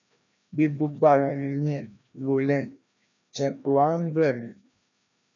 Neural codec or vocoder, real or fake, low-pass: codec, 16 kHz, 1 kbps, FreqCodec, larger model; fake; 7.2 kHz